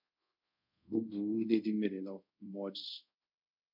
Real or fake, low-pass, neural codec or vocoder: fake; 5.4 kHz; codec, 24 kHz, 0.5 kbps, DualCodec